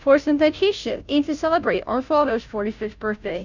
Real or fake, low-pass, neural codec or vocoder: fake; 7.2 kHz; codec, 16 kHz, 0.5 kbps, FunCodec, trained on Chinese and English, 25 frames a second